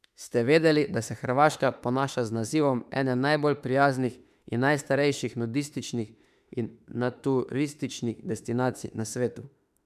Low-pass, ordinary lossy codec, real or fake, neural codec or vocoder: 14.4 kHz; none; fake; autoencoder, 48 kHz, 32 numbers a frame, DAC-VAE, trained on Japanese speech